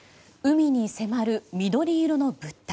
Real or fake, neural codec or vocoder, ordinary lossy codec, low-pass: real; none; none; none